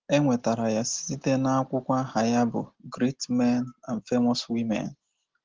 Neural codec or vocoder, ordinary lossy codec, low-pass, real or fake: none; Opus, 16 kbps; 7.2 kHz; real